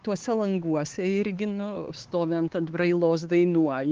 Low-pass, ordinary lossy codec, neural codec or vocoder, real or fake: 7.2 kHz; Opus, 16 kbps; codec, 16 kHz, 4 kbps, X-Codec, HuBERT features, trained on LibriSpeech; fake